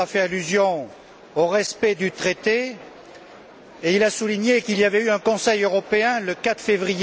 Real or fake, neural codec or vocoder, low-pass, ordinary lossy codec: real; none; none; none